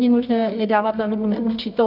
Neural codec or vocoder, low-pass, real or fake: codec, 24 kHz, 0.9 kbps, WavTokenizer, medium music audio release; 5.4 kHz; fake